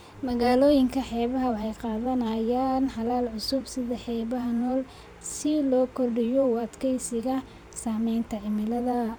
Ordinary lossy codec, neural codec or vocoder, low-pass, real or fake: none; vocoder, 44.1 kHz, 128 mel bands every 512 samples, BigVGAN v2; none; fake